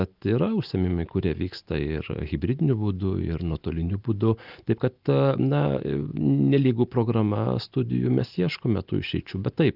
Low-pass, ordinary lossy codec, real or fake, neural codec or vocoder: 5.4 kHz; Opus, 24 kbps; real; none